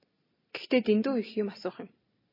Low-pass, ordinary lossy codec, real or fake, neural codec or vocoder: 5.4 kHz; MP3, 24 kbps; real; none